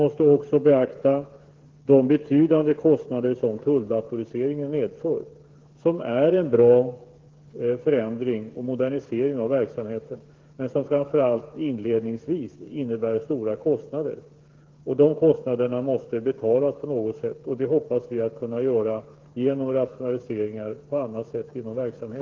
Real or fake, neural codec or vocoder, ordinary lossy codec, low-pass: fake; codec, 16 kHz, 8 kbps, FreqCodec, smaller model; Opus, 16 kbps; 7.2 kHz